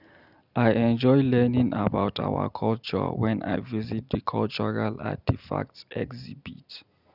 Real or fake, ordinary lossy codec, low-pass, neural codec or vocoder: real; none; 5.4 kHz; none